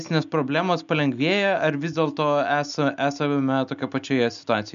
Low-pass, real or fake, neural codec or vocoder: 7.2 kHz; real; none